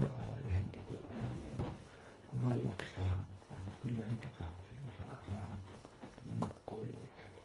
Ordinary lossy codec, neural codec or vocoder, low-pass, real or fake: AAC, 32 kbps; codec, 24 kHz, 1.5 kbps, HILCodec; 10.8 kHz; fake